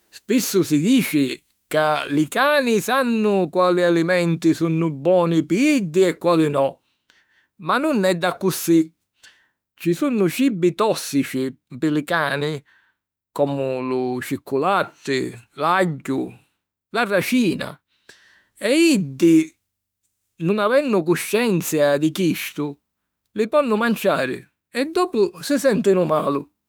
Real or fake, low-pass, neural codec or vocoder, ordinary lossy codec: fake; none; autoencoder, 48 kHz, 32 numbers a frame, DAC-VAE, trained on Japanese speech; none